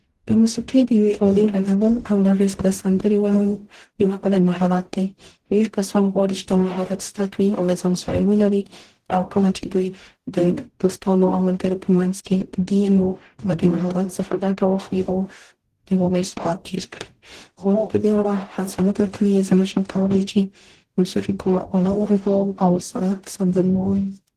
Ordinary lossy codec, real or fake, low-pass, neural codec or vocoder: Opus, 16 kbps; fake; 14.4 kHz; codec, 44.1 kHz, 0.9 kbps, DAC